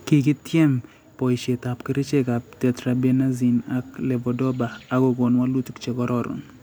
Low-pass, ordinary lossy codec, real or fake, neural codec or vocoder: none; none; real; none